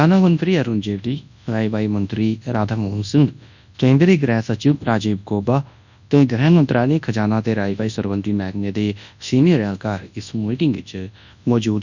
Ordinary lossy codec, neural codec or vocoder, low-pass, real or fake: none; codec, 24 kHz, 0.9 kbps, WavTokenizer, large speech release; 7.2 kHz; fake